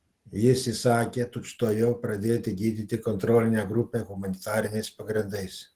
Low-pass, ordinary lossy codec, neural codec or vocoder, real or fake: 14.4 kHz; Opus, 16 kbps; none; real